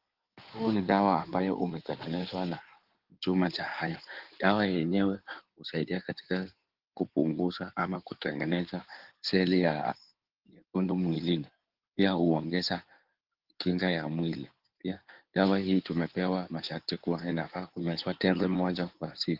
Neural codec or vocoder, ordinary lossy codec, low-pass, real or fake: codec, 16 kHz in and 24 kHz out, 2.2 kbps, FireRedTTS-2 codec; Opus, 16 kbps; 5.4 kHz; fake